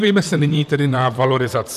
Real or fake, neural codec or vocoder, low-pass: fake; vocoder, 44.1 kHz, 128 mel bands, Pupu-Vocoder; 14.4 kHz